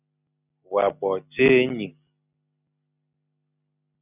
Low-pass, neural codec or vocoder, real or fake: 3.6 kHz; none; real